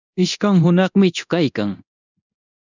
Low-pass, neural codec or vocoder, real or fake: 7.2 kHz; codec, 24 kHz, 0.9 kbps, DualCodec; fake